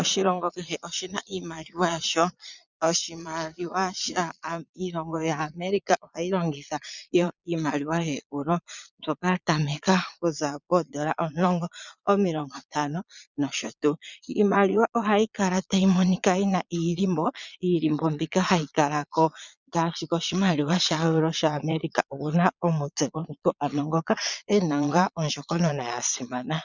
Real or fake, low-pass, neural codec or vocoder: fake; 7.2 kHz; vocoder, 22.05 kHz, 80 mel bands, Vocos